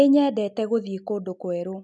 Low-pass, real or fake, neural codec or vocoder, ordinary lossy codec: 10.8 kHz; real; none; none